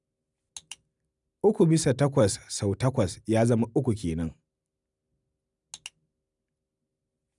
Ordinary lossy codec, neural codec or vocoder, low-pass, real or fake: none; none; 10.8 kHz; real